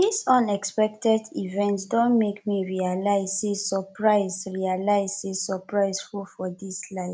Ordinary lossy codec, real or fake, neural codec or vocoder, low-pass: none; real; none; none